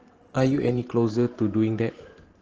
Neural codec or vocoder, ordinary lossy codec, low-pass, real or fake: vocoder, 44.1 kHz, 128 mel bands every 512 samples, BigVGAN v2; Opus, 16 kbps; 7.2 kHz; fake